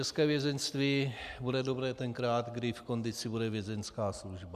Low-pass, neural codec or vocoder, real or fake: 14.4 kHz; none; real